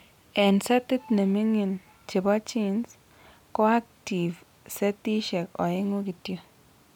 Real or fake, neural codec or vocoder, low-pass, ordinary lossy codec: real; none; 19.8 kHz; none